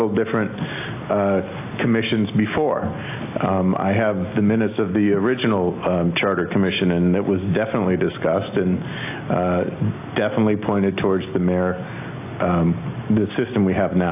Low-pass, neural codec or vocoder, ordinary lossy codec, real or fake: 3.6 kHz; none; AAC, 24 kbps; real